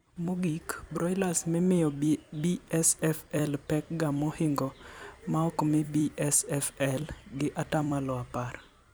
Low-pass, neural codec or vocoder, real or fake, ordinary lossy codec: none; vocoder, 44.1 kHz, 128 mel bands every 256 samples, BigVGAN v2; fake; none